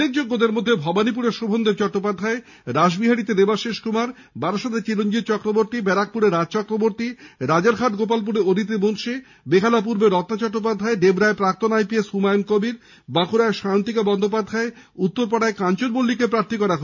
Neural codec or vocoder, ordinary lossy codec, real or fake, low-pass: none; none; real; 7.2 kHz